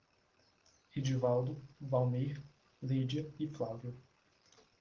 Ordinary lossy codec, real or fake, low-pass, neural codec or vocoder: Opus, 16 kbps; real; 7.2 kHz; none